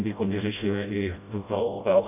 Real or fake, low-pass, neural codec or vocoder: fake; 3.6 kHz; codec, 16 kHz, 0.5 kbps, FreqCodec, smaller model